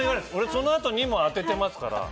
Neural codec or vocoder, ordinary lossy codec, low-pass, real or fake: none; none; none; real